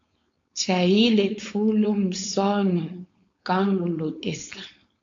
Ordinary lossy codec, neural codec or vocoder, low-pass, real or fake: AAC, 48 kbps; codec, 16 kHz, 4.8 kbps, FACodec; 7.2 kHz; fake